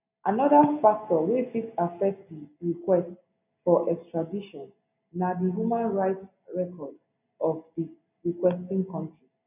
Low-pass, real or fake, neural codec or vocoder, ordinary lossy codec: 3.6 kHz; real; none; none